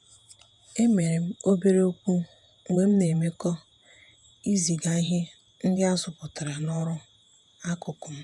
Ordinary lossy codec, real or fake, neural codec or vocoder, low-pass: MP3, 96 kbps; fake; vocoder, 48 kHz, 128 mel bands, Vocos; 10.8 kHz